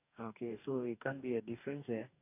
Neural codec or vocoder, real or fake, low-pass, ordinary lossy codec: codec, 44.1 kHz, 2.6 kbps, DAC; fake; 3.6 kHz; none